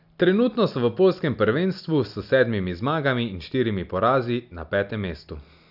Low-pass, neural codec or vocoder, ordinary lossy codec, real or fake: 5.4 kHz; none; none; real